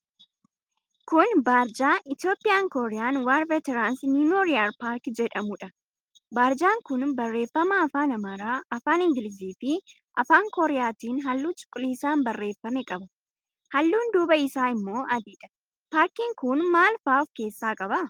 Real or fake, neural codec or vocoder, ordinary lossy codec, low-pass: real; none; Opus, 32 kbps; 19.8 kHz